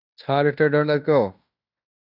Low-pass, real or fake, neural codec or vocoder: 5.4 kHz; fake; codec, 16 kHz in and 24 kHz out, 0.9 kbps, LongCat-Audio-Codec, fine tuned four codebook decoder